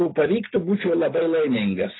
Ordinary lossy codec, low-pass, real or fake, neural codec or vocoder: AAC, 16 kbps; 7.2 kHz; real; none